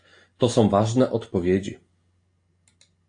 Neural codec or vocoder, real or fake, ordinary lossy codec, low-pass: none; real; AAC, 48 kbps; 9.9 kHz